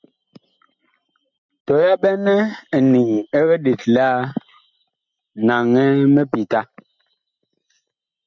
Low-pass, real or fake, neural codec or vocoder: 7.2 kHz; real; none